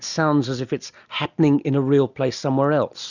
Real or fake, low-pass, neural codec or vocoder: real; 7.2 kHz; none